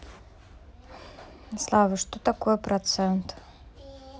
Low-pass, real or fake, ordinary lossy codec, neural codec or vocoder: none; real; none; none